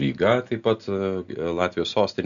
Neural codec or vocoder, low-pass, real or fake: none; 7.2 kHz; real